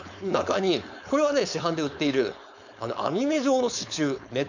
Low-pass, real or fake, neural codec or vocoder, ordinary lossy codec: 7.2 kHz; fake; codec, 16 kHz, 4.8 kbps, FACodec; none